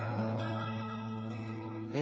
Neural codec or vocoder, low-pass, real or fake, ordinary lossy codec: codec, 16 kHz, 4 kbps, FreqCodec, smaller model; none; fake; none